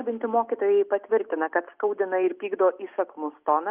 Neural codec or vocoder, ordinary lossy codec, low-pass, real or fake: none; Opus, 24 kbps; 3.6 kHz; real